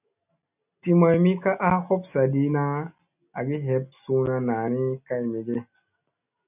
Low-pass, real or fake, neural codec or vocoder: 3.6 kHz; real; none